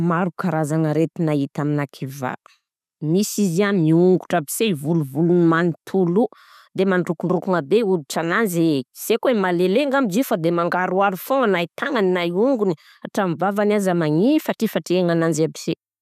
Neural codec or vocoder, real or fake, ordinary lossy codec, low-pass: none; real; none; 14.4 kHz